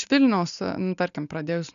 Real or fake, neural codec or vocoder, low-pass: real; none; 7.2 kHz